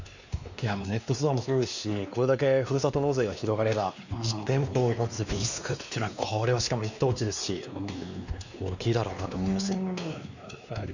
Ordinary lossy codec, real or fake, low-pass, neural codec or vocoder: none; fake; 7.2 kHz; codec, 16 kHz, 2 kbps, X-Codec, WavLM features, trained on Multilingual LibriSpeech